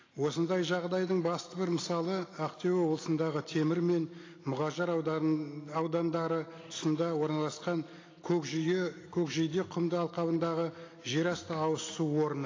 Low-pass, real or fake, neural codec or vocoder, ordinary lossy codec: 7.2 kHz; real; none; AAC, 32 kbps